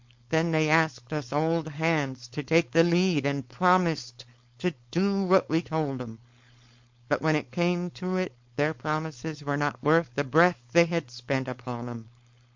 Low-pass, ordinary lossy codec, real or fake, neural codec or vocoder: 7.2 kHz; MP3, 48 kbps; fake; codec, 16 kHz, 4.8 kbps, FACodec